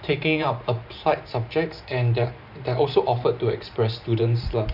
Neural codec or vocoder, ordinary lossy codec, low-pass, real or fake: vocoder, 44.1 kHz, 128 mel bands every 512 samples, BigVGAN v2; none; 5.4 kHz; fake